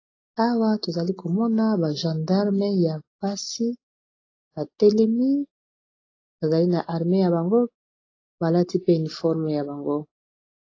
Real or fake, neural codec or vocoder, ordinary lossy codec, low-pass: real; none; AAC, 32 kbps; 7.2 kHz